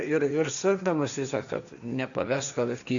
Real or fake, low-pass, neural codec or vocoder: fake; 7.2 kHz; codec, 16 kHz, 1.1 kbps, Voila-Tokenizer